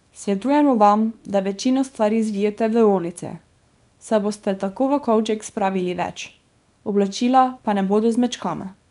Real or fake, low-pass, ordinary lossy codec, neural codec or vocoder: fake; 10.8 kHz; none; codec, 24 kHz, 0.9 kbps, WavTokenizer, small release